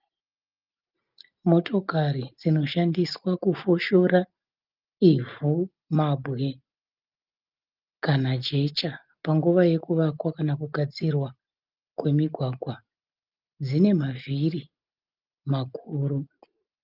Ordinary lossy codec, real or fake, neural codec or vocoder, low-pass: Opus, 24 kbps; real; none; 5.4 kHz